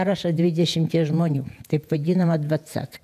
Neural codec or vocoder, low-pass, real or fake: vocoder, 48 kHz, 128 mel bands, Vocos; 14.4 kHz; fake